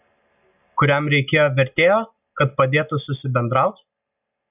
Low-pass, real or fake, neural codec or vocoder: 3.6 kHz; real; none